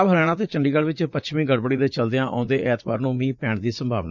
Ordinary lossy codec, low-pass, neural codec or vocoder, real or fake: none; 7.2 kHz; vocoder, 44.1 kHz, 80 mel bands, Vocos; fake